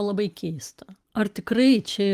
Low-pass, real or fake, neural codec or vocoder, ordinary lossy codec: 14.4 kHz; real; none; Opus, 32 kbps